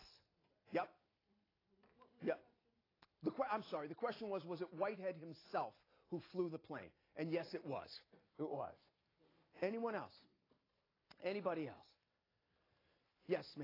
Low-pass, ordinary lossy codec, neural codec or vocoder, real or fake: 5.4 kHz; AAC, 24 kbps; none; real